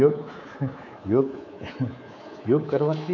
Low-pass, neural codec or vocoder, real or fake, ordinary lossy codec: 7.2 kHz; codec, 24 kHz, 3.1 kbps, DualCodec; fake; none